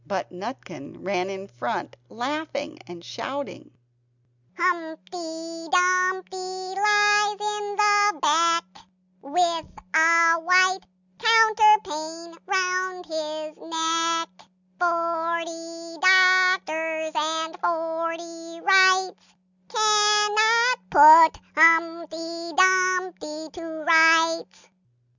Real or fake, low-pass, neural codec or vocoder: real; 7.2 kHz; none